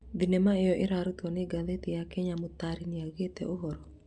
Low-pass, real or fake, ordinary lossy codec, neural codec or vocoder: 9.9 kHz; real; Opus, 64 kbps; none